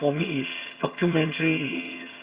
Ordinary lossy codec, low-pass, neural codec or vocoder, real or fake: Opus, 64 kbps; 3.6 kHz; vocoder, 22.05 kHz, 80 mel bands, HiFi-GAN; fake